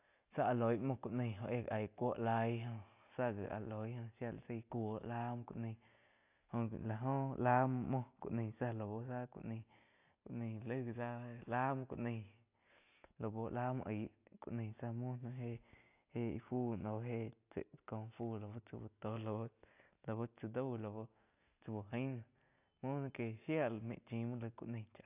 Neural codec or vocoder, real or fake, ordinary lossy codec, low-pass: none; real; AAC, 32 kbps; 3.6 kHz